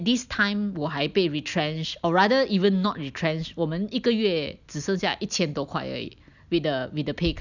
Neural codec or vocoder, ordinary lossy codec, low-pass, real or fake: none; none; 7.2 kHz; real